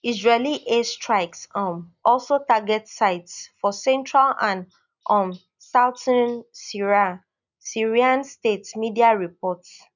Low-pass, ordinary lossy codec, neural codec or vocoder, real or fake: 7.2 kHz; none; none; real